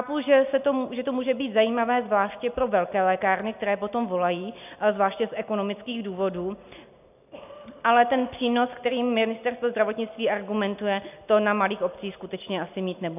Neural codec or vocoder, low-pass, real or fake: none; 3.6 kHz; real